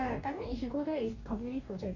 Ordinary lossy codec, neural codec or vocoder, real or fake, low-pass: none; codec, 44.1 kHz, 2.6 kbps, DAC; fake; 7.2 kHz